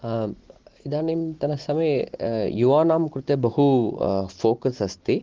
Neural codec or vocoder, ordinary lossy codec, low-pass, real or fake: none; Opus, 16 kbps; 7.2 kHz; real